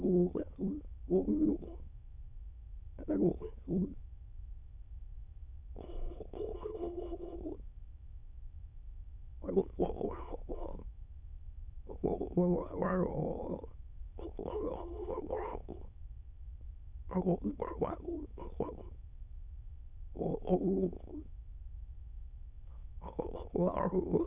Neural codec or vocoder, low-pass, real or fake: autoencoder, 22.05 kHz, a latent of 192 numbers a frame, VITS, trained on many speakers; 3.6 kHz; fake